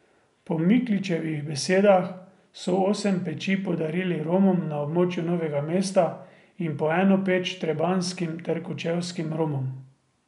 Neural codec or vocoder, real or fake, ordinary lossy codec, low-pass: none; real; none; 10.8 kHz